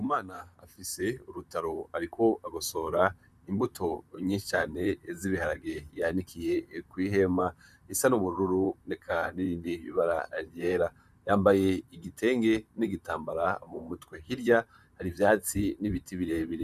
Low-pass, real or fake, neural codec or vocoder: 14.4 kHz; fake; vocoder, 44.1 kHz, 128 mel bands, Pupu-Vocoder